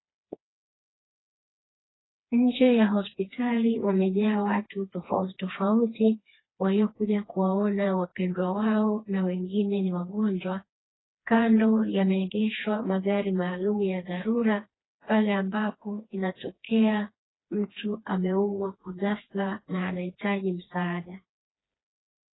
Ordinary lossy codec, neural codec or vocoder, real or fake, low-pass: AAC, 16 kbps; codec, 16 kHz, 2 kbps, FreqCodec, smaller model; fake; 7.2 kHz